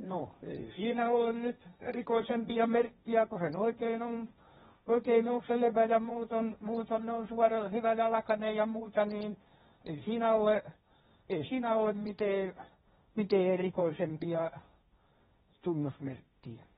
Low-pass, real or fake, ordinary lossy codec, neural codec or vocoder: 7.2 kHz; fake; AAC, 16 kbps; codec, 16 kHz, 1.1 kbps, Voila-Tokenizer